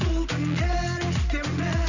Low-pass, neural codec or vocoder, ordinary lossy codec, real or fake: 7.2 kHz; none; none; real